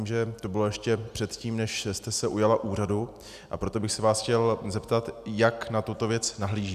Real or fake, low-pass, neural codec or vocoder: real; 14.4 kHz; none